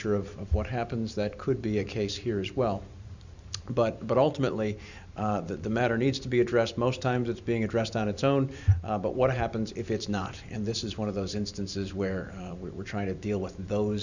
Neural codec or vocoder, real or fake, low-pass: vocoder, 44.1 kHz, 128 mel bands every 512 samples, BigVGAN v2; fake; 7.2 kHz